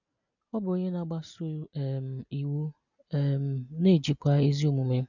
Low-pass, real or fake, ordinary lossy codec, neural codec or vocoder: 7.2 kHz; real; none; none